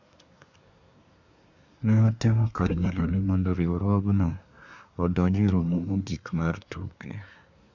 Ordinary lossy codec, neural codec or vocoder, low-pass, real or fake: none; codec, 24 kHz, 1 kbps, SNAC; 7.2 kHz; fake